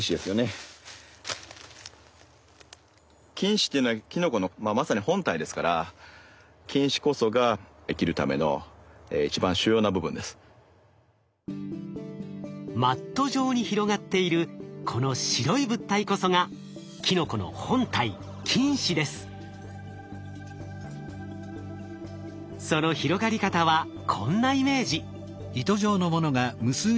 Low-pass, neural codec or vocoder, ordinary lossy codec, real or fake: none; none; none; real